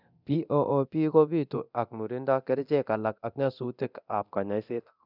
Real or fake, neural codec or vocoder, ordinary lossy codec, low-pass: fake; codec, 24 kHz, 0.9 kbps, DualCodec; none; 5.4 kHz